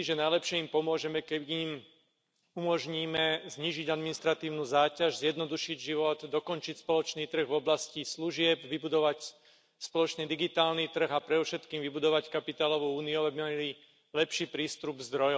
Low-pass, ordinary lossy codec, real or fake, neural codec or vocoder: none; none; real; none